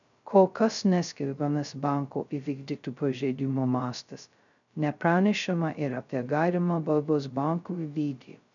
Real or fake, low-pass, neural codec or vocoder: fake; 7.2 kHz; codec, 16 kHz, 0.2 kbps, FocalCodec